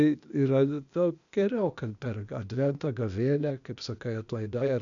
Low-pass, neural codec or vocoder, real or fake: 7.2 kHz; codec, 16 kHz, 0.8 kbps, ZipCodec; fake